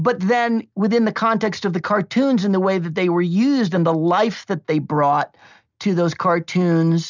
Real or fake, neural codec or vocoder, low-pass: real; none; 7.2 kHz